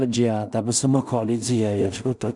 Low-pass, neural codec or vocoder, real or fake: 10.8 kHz; codec, 16 kHz in and 24 kHz out, 0.4 kbps, LongCat-Audio-Codec, two codebook decoder; fake